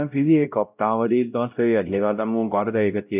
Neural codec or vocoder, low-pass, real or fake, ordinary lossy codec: codec, 16 kHz, 0.5 kbps, X-Codec, WavLM features, trained on Multilingual LibriSpeech; 3.6 kHz; fake; none